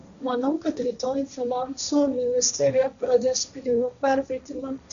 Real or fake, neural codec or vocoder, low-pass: fake; codec, 16 kHz, 1.1 kbps, Voila-Tokenizer; 7.2 kHz